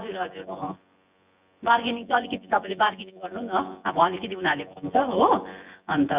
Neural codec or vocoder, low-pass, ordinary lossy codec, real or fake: vocoder, 24 kHz, 100 mel bands, Vocos; 3.6 kHz; Opus, 64 kbps; fake